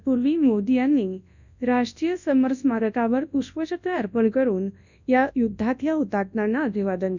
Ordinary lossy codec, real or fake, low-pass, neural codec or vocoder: none; fake; 7.2 kHz; codec, 24 kHz, 0.9 kbps, WavTokenizer, large speech release